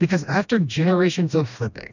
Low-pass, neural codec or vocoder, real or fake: 7.2 kHz; codec, 16 kHz, 1 kbps, FreqCodec, smaller model; fake